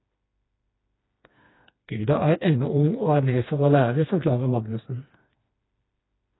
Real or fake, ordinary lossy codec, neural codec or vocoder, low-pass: fake; AAC, 16 kbps; codec, 16 kHz, 2 kbps, FreqCodec, smaller model; 7.2 kHz